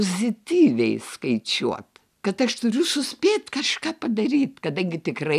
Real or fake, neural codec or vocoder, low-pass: real; none; 14.4 kHz